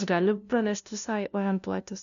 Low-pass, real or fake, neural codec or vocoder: 7.2 kHz; fake; codec, 16 kHz, 0.5 kbps, FunCodec, trained on LibriTTS, 25 frames a second